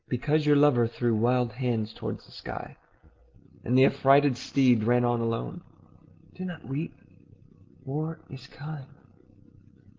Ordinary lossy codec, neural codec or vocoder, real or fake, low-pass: Opus, 16 kbps; none; real; 7.2 kHz